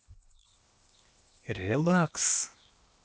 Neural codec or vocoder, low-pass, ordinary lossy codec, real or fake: codec, 16 kHz, 0.8 kbps, ZipCodec; none; none; fake